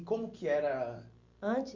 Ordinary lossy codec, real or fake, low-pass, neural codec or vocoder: Opus, 64 kbps; real; 7.2 kHz; none